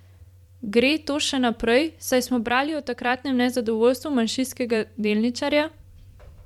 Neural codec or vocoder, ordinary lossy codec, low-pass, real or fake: vocoder, 44.1 kHz, 128 mel bands every 512 samples, BigVGAN v2; MP3, 96 kbps; 19.8 kHz; fake